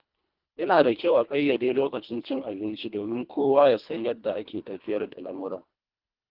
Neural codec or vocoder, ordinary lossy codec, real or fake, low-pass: codec, 24 kHz, 1.5 kbps, HILCodec; Opus, 24 kbps; fake; 5.4 kHz